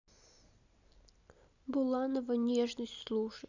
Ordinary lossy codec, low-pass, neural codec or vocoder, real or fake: none; 7.2 kHz; none; real